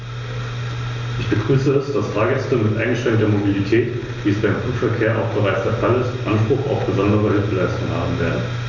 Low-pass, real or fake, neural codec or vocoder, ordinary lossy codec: 7.2 kHz; real; none; none